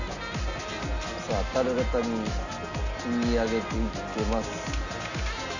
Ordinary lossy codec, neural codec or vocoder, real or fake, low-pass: none; none; real; 7.2 kHz